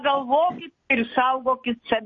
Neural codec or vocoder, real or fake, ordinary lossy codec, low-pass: none; real; MP3, 32 kbps; 7.2 kHz